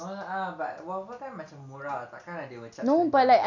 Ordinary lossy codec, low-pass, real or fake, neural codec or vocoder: none; 7.2 kHz; real; none